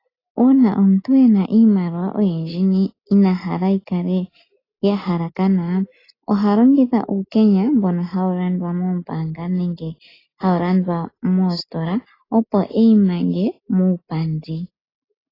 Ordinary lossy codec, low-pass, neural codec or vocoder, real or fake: AAC, 24 kbps; 5.4 kHz; none; real